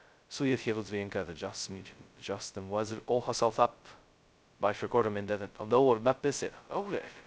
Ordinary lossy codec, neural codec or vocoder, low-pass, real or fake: none; codec, 16 kHz, 0.2 kbps, FocalCodec; none; fake